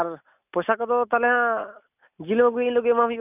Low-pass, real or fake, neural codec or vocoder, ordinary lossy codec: 3.6 kHz; real; none; none